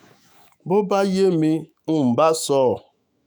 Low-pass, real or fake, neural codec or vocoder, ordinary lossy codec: none; fake; autoencoder, 48 kHz, 128 numbers a frame, DAC-VAE, trained on Japanese speech; none